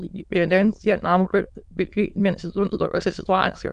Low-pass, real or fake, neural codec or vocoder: 9.9 kHz; fake; autoencoder, 22.05 kHz, a latent of 192 numbers a frame, VITS, trained on many speakers